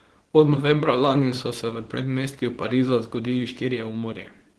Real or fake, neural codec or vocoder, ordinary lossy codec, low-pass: fake; codec, 24 kHz, 0.9 kbps, WavTokenizer, small release; Opus, 16 kbps; 10.8 kHz